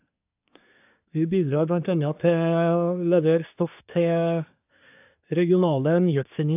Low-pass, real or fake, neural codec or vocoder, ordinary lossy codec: 3.6 kHz; fake; codec, 24 kHz, 1 kbps, SNAC; AAC, 32 kbps